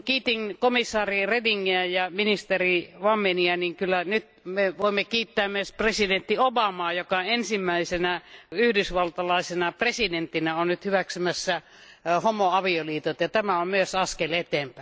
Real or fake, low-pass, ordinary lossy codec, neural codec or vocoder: real; none; none; none